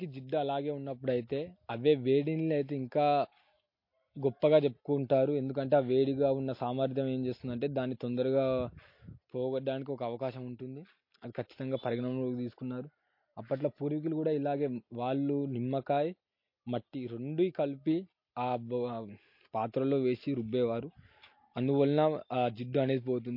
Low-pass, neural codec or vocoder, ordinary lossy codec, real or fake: 5.4 kHz; none; MP3, 32 kbps; real